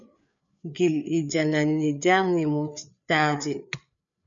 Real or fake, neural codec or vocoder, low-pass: fake; codec, 16 kHz, 4 kbps, FreqCodec, larger model; 7.2 kHz